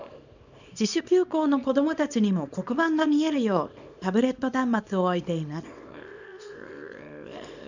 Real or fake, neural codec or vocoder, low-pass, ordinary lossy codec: fake; codec, 24 kHz, 0.9 kbps, WavTokenizer, small release; 7.2 kHz; none